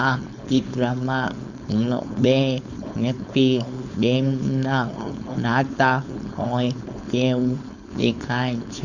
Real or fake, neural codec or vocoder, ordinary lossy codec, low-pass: fake; codec, 16 kHz, 4.8 kbps, FACodec; none; 7.2 kHz